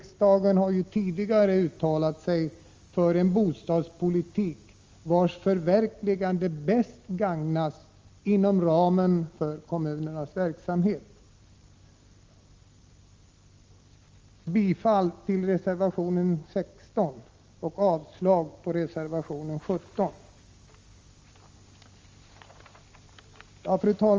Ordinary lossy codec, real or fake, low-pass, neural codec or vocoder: Opus, 32 kbps; real; 7.2 kHz; none